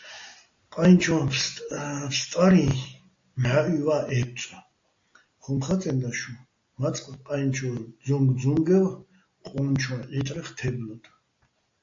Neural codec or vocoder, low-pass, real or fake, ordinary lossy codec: none; 7.2 kHz; real; AAC, 48 kbps